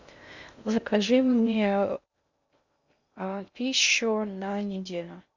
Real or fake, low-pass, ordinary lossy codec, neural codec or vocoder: fake; 7.2 kHz; Opus, 64 kbps; codec, 16 kHz in and 24 kHz out, 0.6 kbps, FocalCodec, streaming, 2048 codes